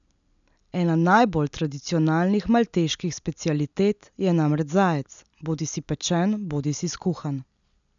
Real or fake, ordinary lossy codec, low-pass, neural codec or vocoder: real; none; 7.2 kHz; none